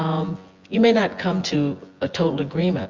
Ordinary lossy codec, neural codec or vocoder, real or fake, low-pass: Opus, 32 kbps; vocoder, 24 kHz, 100 mel bands, Vocos; fake; 7.2 kHz